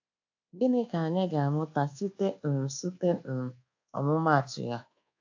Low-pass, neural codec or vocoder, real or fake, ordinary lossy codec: 7.2 kHz; codec, 24 kHz, 1.2 kbps, DualCodec; fake; AAC, 48 kbps